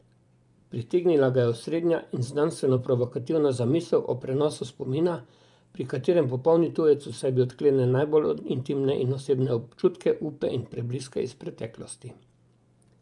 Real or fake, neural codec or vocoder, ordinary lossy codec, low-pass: fake; vocoder, 24 kHz, 100 mel bands, Vocos; none; 10.8 kHz